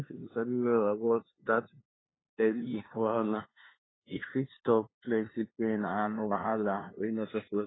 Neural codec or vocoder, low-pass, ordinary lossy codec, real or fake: codec, 16 kHz, 2 kbps, FunCodec, trained on LibriTTS, 25 frames a second; 7.2 kHz; AAC, 16 kbps; fake